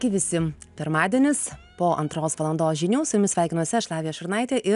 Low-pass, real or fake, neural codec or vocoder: 10.8 kHz; real; none